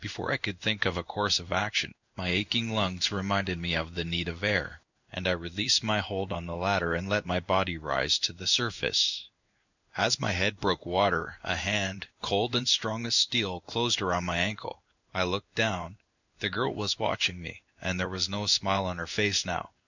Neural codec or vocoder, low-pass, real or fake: codec, 16 kHz in and 24 kHz out, 1 kbps, XY-Tokenizer; 7.2 kHz; fake